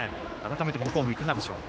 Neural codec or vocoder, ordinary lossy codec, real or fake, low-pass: codec, 16 kHz, 2 kbps, X-Codec, HuBERT features, trained on general audio; none; fake; none